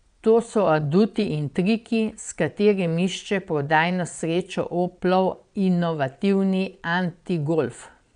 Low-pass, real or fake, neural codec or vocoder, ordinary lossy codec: 9.9 kHz; fake; vocoder, 22.05 kHz, 80 mel bands, Vocos; none